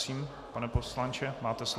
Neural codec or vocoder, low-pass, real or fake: none; 14.4 kHz; real